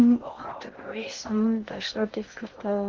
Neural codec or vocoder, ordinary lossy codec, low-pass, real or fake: codec, 16 kHz in and 24 kHz out, 0.6 kbps, FocalCodec, streaming, 2048 codes; Opus, 16 kbps; 7.2 kHz; fake